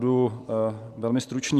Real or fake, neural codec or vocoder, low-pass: real; none; 14.4 kHz